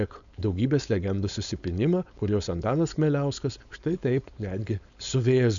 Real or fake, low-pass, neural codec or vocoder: fake; 7.2 kHz; codec, 16 kHz, 4.8 kbps, FACodec